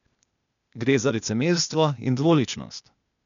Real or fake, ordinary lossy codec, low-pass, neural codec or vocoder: fake; none; 7.2 kHz; codec, 16 kHz, 0.8 kbps, ZipCodec